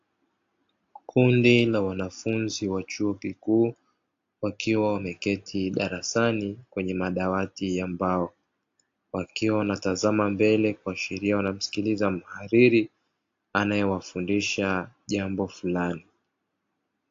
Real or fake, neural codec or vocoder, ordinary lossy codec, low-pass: real; none; AAC, 48 kbps; 7.2 kHz